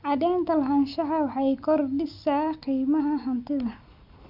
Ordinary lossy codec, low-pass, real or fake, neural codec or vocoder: MP3, 48 kbps; 5.4 kHz; real; none